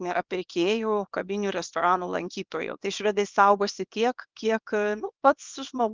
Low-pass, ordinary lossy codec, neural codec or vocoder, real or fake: 7.2 kHz; Opus, 24 kbps; codec, 24 kHz, 0.9 kbps, WavTokenizer, small release; fake